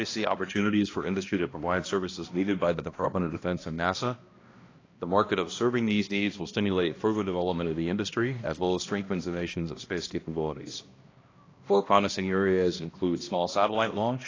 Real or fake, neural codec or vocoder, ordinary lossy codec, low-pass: fake; codec, 16 kHz, 1 kbps, X-Codec, HuBERT features, trained on balanced general audio; AAC, 32 kbps; 7.2 kHz